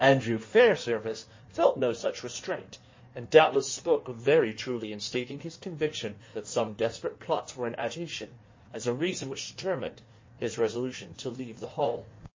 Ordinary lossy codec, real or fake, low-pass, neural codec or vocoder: MP3, 32 kbps; fake; 7.2 kHz; codec, 16 kHz in and 24 kHz out, 1.1 kbps, FireRedTTS-2 codec